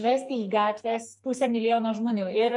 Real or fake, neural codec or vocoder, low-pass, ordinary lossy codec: fake; codec, 44.1 kHz, 2.6 kbps, SNAC; 10.8 kHz; MP3, 64 kbps